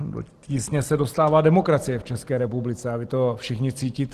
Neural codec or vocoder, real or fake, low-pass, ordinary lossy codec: none; real; 14.4 kHz; Opus, 16 kbps